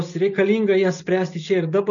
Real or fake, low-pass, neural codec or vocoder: real; 7.2 kHz; none